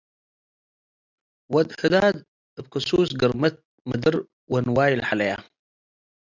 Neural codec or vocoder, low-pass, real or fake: none; 7.2 kHz; real